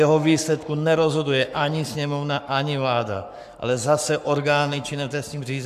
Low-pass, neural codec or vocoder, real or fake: 14.4 kHz; codec, 44.1 kHz, 7.8 kbps, Pupu-Codec; fake